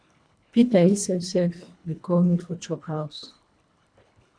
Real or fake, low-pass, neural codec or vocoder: fake; 9.9 kHz; codec, 24 kHz, 1.5 kbps, HILCodec